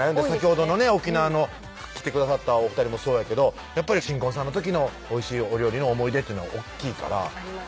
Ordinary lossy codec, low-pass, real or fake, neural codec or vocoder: none; none; real; none